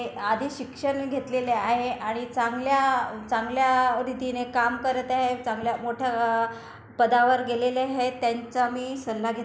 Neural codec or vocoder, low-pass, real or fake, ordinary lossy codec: none; none; real; none